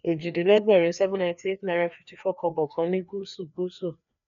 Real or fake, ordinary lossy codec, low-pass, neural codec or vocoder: fake; none; 7.2 kHz; codec, 16 kHz, 2 kbps, FreqCodec, larger model